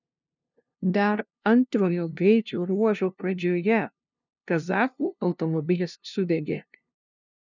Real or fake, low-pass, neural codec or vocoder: fake; 7.2 kHz; codec, 16 kHz, 0.5 kbps, FunCodec, trained on LibriTTS, 25 frames a second